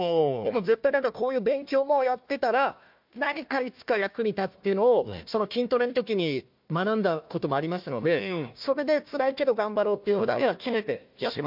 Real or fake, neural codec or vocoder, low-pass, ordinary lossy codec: fake; codec, 16 kHz, 1 kbps, FunCodec, trained on Chinese and English, 50 frames a second; 5.4 kHz; MP3, 48 kbps